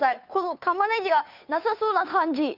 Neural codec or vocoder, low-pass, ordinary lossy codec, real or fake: codec, 16 kHz, 2 kbps, FunCodec, trained on Chinese and English, 25 frames a second; 5.4 kHz; none; fake